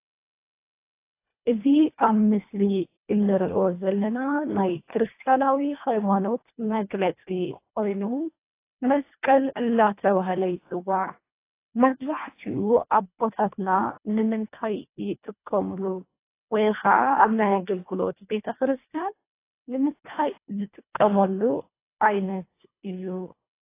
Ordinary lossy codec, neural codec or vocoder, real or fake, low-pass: AAC, 24 kbps; codec, 24 kHz, 1.5 kbps, HILCodec; fake; 3.6 kHz